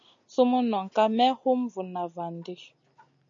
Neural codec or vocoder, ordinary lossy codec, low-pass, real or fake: none; MP3, 48 kbps; 7.2 kHz; real